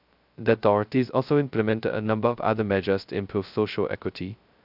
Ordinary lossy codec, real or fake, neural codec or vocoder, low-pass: none; fake; codec, 16 kHz, 0.2 kbps, FocalCodec; 5.4 kHz